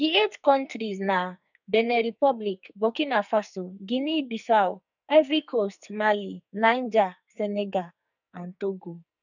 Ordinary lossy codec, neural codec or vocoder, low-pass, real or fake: none; codec, 44.1 kHz, 2.6 kbps, SNAC; 7.2 kHz; fake